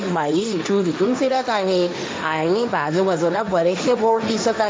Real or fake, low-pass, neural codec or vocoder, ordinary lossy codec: fake; none; codec, 16 kHz, 1.1 kbps, Voila-Tokenizer; none